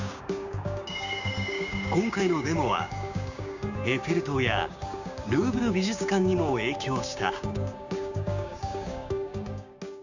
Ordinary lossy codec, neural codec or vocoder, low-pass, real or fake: none; codec, 16 kHz, 6 kbps, DAC; 7.2 kHz; fake